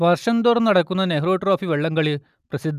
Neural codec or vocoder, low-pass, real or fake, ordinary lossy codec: none; 14.4 kHz; real; none